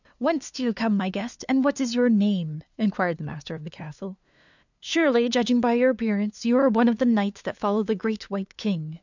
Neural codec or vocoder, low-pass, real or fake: codec, 16 kHz, 2 kbps, FunCodec, trained on LibriTTS, 25 frames a second; 7.2 kHz; fake